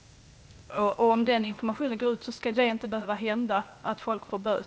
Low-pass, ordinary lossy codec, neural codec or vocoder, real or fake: none; none; codec, 16 kHz, 0.8 kbps, ZipCodec; fake